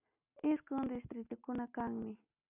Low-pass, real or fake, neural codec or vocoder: 3.6 kHz; real; none